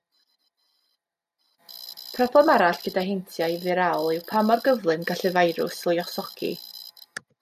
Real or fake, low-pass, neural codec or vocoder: real; 14.4 kHz; none